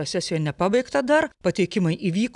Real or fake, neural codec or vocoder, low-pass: real; none; 10.8 kHz